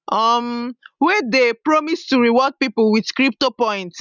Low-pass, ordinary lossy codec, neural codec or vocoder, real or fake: 7.2 kHz; none; none; real